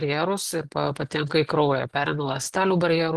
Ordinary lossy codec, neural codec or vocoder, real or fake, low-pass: Opus, 16 kbps; none; real; 10.8 kHz